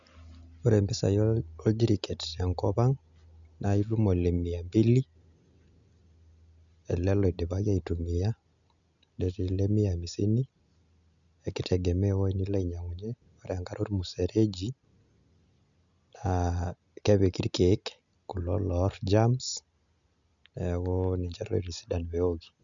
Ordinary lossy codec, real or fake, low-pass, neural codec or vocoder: none; real; 7.2 kHz; none